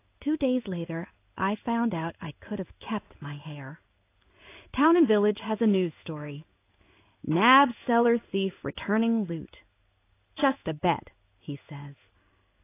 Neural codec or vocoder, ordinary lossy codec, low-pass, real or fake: codec, 16 kHz in and 24 kHz out, 1 kbps, XY-Tokenizer; AAC, 24 kbps; 3.6 kHz; fake